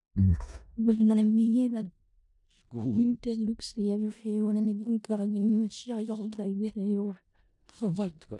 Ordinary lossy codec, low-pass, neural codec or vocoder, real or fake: none; 10.8 kHz; codec, 16 kHz in and 24 kHz out, 0.4 kbps, LongCat-Audio-Codec, four codebook decoder; fake